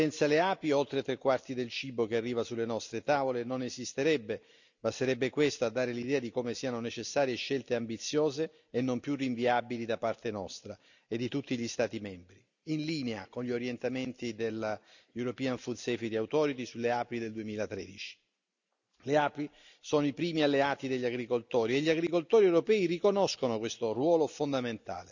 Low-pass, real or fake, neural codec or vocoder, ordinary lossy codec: 7.2 kHz; real; none; none